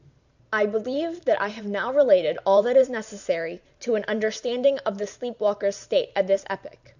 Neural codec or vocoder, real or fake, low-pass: vocoder, 44.1 kHz, 128 mel bands every 512 samples, BigVGAN v2; fake; 7.2 kHz